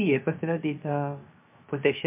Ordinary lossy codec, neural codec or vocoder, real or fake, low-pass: MP3, 32 kbps; codec, 16 kHz, 0.7 kbps, FocalCodec; fake; 3.6 kHz